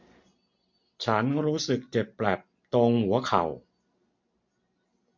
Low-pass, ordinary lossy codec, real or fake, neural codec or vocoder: 7.2 kHz; MP3, 48 kbps; real; none